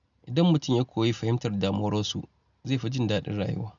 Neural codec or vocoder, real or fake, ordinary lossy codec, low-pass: none; real; none; 7.2 kHz